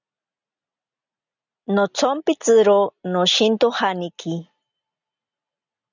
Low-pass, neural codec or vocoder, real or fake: 7.2 kHz; none; real